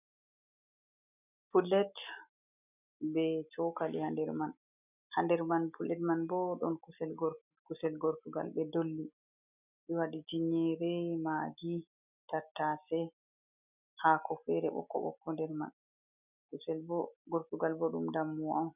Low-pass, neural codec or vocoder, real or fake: 3.6 kHz; none; real